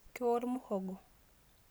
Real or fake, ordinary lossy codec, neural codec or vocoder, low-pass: real; none; none; none